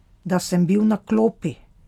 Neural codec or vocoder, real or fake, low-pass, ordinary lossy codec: none; real; 19.8 kHz; none